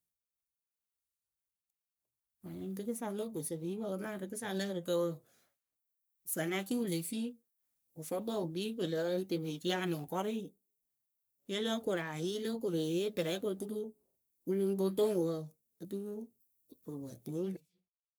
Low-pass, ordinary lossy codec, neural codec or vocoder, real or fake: none; none; codec, 44.1 kHz, 3.4 kbps, Pupu-Codec; fake